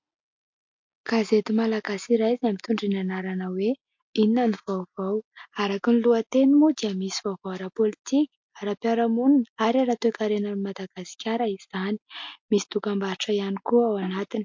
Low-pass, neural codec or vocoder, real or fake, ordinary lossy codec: 7.2 kHz; none; real; MP3, 48 kbps